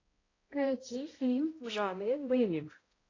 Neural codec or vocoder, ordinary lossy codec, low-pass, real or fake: codec, 16 kHz, 0.5 kbps, X-Codec, HuBERT features, trained on balanced general audio; AAC, 32 kbps; 7.2 kHz; fake